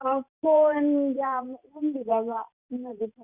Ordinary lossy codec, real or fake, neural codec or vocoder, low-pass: Opus, 64 kbps; fake; vocoder, 44.1 kHz, 128 mel bands every 512 samples, BigVGAN v2; 3.6 kHz